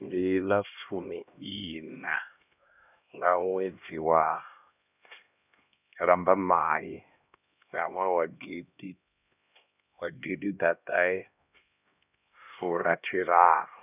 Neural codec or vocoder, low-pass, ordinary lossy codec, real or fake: codec, 16 kHz, 1 kbps, X-Codec, HuBERT features, trained on LibriSpeech; 3.6 kHz; none; fake